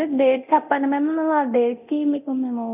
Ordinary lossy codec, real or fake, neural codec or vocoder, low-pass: none; fake; codec, 24 kHz, 0.9 kbps, DualCodec; 3.6 kHz